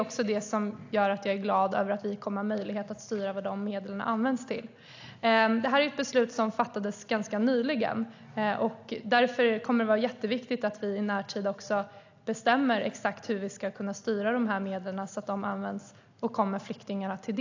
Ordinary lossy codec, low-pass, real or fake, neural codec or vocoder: AAC, 48 kbps; 7.2 kHz; real; none